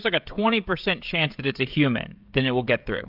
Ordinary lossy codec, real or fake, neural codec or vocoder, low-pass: AAC, 48 kbps; fake; codec, 16 kHz, 16 kbps, FreqCodec, smaller model; 5.4 kHz